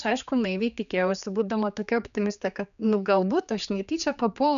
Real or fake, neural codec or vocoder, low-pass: fake; codec, 16 kHz, 4 kbps, X-Codec, HuBERT features, trained on general audio; 7.2 kHz